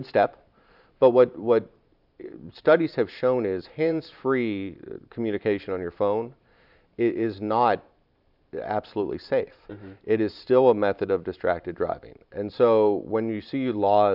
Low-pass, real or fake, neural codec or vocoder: 5.4 kHz; fake; vocoder, 44.1 kHz, 128 mel bands every 512 samples, BigVGAN v2